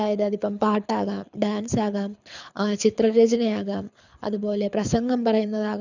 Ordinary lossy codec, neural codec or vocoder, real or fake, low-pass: none; codec, 24 kHz, 6 kbps, HILCodec; fake; 7.2 kHz